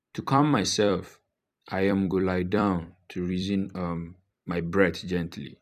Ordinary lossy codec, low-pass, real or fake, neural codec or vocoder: none; 14.4 kHz; fake; vocoder, 44.1 kHz, 128 mel bands every 256 samples, BigVGAN v2